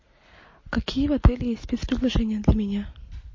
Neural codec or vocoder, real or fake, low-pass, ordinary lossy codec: none; real; 7.2 kHz; MP3, 32 kbps